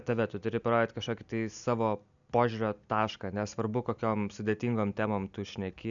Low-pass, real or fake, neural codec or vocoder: 7.2 kHz; real; none